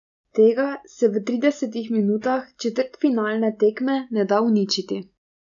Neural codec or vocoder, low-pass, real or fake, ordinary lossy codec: none; 7.2 kHz; real; none